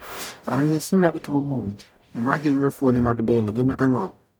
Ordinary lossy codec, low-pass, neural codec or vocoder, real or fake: none; none; codec, 44.1 kHz, 0.9 kbps, DAC; fake